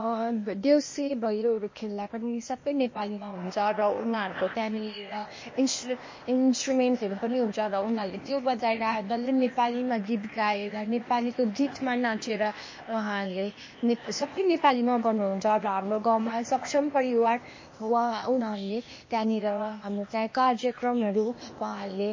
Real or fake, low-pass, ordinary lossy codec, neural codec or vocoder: fake; 7.2 kHz; MP3, 32 kbps; codec, 16 kHz, 0.8 kbps, ZipCodec